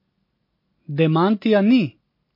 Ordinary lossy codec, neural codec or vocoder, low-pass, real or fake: MP3, 32 kbps; none; 5.4 kHz; real